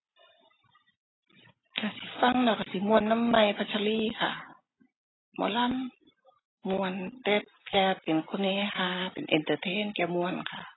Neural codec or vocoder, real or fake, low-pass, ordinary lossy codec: none; real; 7.2 kHz; AAC, 16 kbps